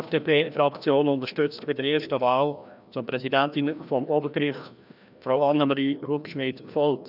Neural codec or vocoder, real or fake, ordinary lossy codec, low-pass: codec, 16 kHz, 1 kbps, FreqCodec, larger model; fake; none; 5.4 kHz